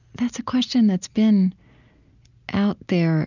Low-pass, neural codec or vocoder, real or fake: 7.2 kHz; none; real